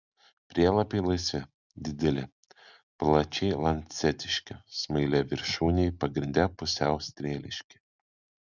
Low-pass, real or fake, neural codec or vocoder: 7.2 kHz; real; none